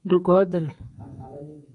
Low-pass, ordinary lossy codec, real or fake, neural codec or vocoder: 10.8 kHz; MP3, 96 kbps; fake; codec, 32 kHz, 1.9 kbps, SNAC